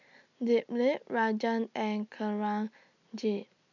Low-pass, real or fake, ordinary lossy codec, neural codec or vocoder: 7.2 kHz; real; none; none